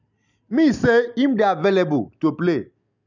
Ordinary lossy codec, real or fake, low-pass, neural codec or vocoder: none; real; 7.2 kHz; none